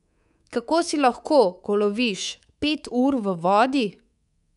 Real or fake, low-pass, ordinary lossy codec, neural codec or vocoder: fake; 10.8 kHz; none; codec, 24 kHz, 3.1 kbps, DualCodec